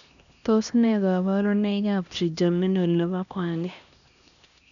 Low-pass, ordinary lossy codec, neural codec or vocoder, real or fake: 7.2 kHz; none; codec, 16 kHz, 1 kbps, X-Codec, HuBERT features, trained on LibriSpeech; fake